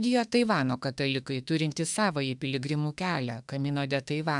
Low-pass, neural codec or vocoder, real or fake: 10.8 kHz; autoencoder, 48 kHz, 32 numbers a frame, DAC-VAE, trained on Japanese speech; fake